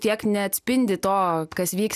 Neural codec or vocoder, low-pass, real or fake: none; 14.4 kHz; real